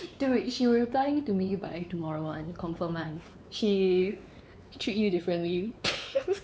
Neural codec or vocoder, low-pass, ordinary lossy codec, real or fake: codec, 16 kHz, 4 kbps, X-Codec, WavLM features, trained on Multilingual LibriSpeech; none; none; fake